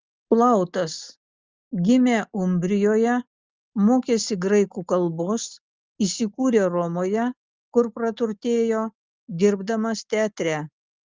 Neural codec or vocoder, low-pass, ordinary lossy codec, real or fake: none; 7.2 kHz; Opus, 32 kbps; real